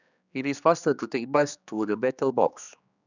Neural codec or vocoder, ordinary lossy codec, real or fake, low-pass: codec, 16 kHz, 2 kbps, X-Codec, HuBERT features, trained on general audio; none; fake; 7.2 kHz